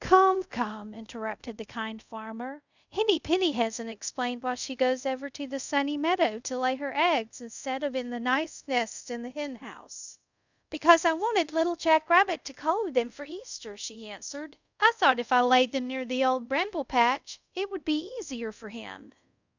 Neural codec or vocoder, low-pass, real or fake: codec, 24 kHz, 0.5 kbps, DualCodec; 7.2 kHz; fake